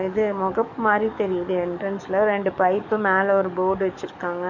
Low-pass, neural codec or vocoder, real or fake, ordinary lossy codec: 7.2 kHz; codec, 44.1 kHz, 7.8 kbps, DAC; fake; none